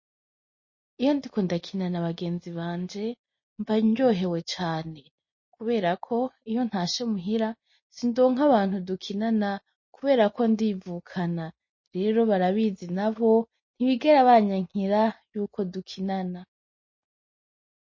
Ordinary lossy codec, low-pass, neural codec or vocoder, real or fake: MP3, 32 kbps; 7.2 kHz; none; real